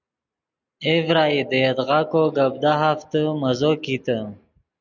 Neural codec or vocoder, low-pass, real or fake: none; 7.2 kHz; real